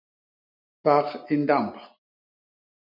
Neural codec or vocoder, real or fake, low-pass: none; real; 5.4 kHz